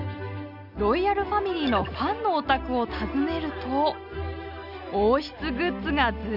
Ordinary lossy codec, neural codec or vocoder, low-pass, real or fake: none; none; 5.4 kHz; real